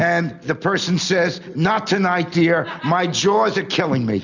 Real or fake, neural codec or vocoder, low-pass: real; none; 7.2 kHz